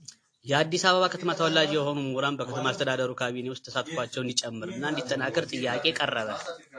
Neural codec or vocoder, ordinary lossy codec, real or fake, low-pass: none; AAC, 48 kbps; real; 9.9 kHz